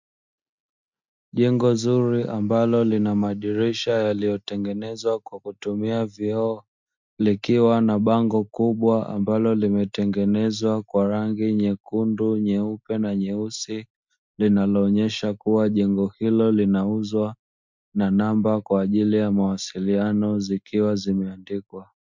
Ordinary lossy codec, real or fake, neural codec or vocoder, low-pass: MP3, 64 kbps; real; none; 7.2 kHz